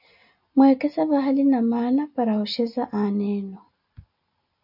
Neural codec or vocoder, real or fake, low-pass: none; real; 5.4 kHz